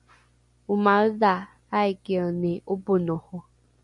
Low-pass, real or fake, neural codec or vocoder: 10.8 kHz; real; none